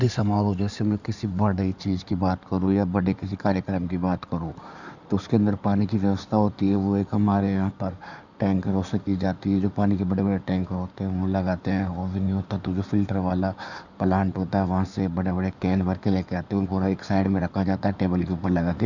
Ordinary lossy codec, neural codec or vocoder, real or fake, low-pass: none; codec, 16 kHz in and 24 kHz out, 2.2 kbps, FireRedTTS-2 codec; fake; 7.2 kHz